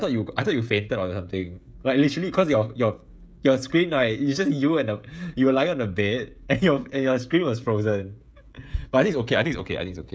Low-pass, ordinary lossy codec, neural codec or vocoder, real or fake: none; none; codec, 16 kHz, 16 kbps, FreqCodec, smaller model; fake